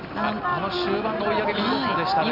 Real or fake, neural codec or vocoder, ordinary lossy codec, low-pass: real; none; none; 5.4 kHz